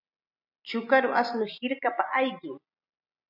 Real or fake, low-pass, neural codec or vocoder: real; 5.4 kHz; none